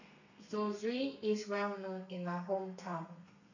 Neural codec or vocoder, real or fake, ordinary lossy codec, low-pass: codec, 32 kHz, 1.9 kbps, SNAC; fake; none; 7.2 kHz